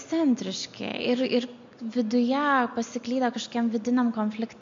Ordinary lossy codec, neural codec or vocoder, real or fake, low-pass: MP3, 48 kbps; none; real; 7.2 kHz